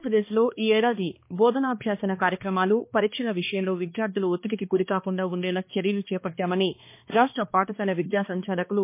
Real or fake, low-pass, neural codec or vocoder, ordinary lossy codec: fake; 3.6 kHz; codec, 16 kHz, 2 kbps, X-Codec, HuBERT features, trained on balanced general audio; MP3, 24 kbps